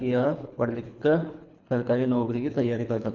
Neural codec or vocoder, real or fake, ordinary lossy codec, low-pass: codec, 24 kHz, 3 kbps, HILCodec; fake; none; 7.2 kHz